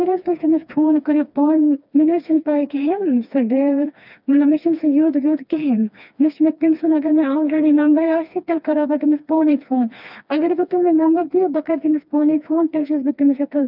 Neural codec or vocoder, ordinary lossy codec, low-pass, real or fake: codec, 16 kHz, 2 kbps, FreqCodec, smaller model; none; 5.4 kHz; fake